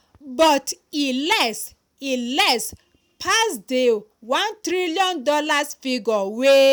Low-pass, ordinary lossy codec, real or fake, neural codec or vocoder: none; none; real; none